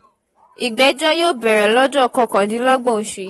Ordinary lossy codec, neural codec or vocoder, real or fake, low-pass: AAC, 32 kbps; vocoder, 48 kHz, 128 mel bands, Vocos; fake; 19.8 kHz